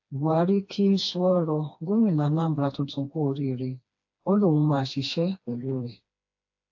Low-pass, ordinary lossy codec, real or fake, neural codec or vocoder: 7.2 kHz; AAC, 48 kbps; fake; codec, 16 kHz, 2 kbps, FreqCodec, smaller model